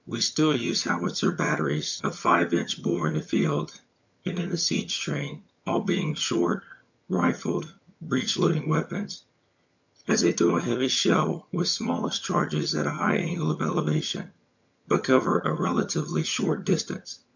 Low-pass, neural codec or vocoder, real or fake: 7.2 kHz; vocoder, 22.05 kHz, 80 mel bands, HiFi-GAN; fake